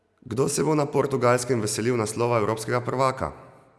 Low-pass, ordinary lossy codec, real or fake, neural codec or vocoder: none; none; real; none